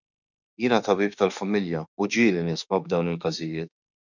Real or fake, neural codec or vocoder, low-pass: fake; autoencoder, 48 kHz, 32 numbers a frame, DAC-VAE, trained on Japanese speech; 7.2 kHz